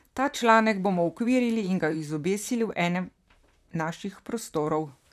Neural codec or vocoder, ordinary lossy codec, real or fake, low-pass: vocoder, 44.1 kHz, 128 mel bands, Pupu-Vocoder; none; fake; 14.4 kHz